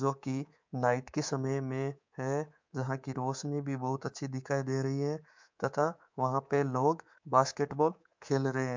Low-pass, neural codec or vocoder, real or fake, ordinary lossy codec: 7.2 kHz; codec, 24 kHz, 3.1 kbps, DualCodec; fake; MP3, 64 kbps